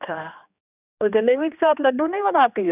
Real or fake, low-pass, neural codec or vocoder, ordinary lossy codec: fake; 3.6 kHz; codec, 16 kHz, 2 kbps, X-Codec, HuBERT features, trained on general audio; none